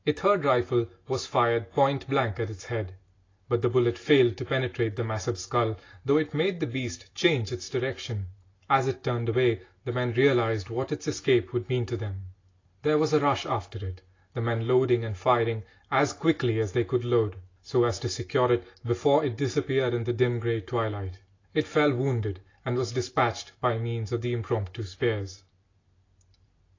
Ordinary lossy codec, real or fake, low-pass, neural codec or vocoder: AAC, 32 kbps; real; 7.2 kHz; none